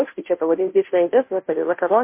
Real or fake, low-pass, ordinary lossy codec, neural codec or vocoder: fake; 3.6 kHz; MP3, 24 kbps; codec, 16 kHz, 0.5 kbps, FunCodec, trained on Chinese and English, 25 frames a second